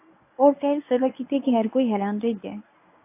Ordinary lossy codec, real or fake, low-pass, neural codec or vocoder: AAC, 24 kbps; fake; 3.6 kHz; codec, 24 kHz, 0.9 kbps, WavTokenizer, medium speech release version 1